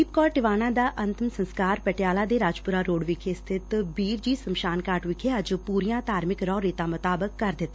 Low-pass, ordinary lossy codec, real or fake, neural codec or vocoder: none; none; real; none